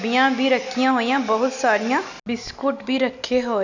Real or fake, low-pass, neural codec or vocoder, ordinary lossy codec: real; 7.2 kHz; none; none